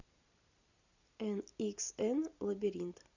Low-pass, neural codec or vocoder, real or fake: 7.2 kHz; none; real